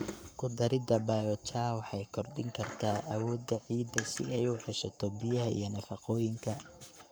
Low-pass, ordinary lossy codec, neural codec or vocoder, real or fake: none; none; codec, 44.1 kHz, 7.8 kbps, Pupu-Codec; fake